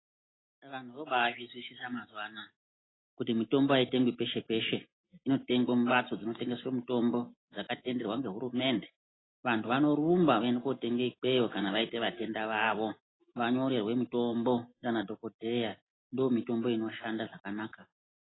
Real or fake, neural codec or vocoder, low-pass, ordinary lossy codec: real; none; 7.2 kHz; AAC, 16 kbps